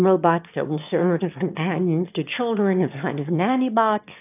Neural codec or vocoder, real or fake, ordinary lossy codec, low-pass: autoencoder, 22.05 kHz, a latent of 192 numbers a frame, VITS, trained on one speaker; fake; AAC, 32 kbps; 3.6 kHz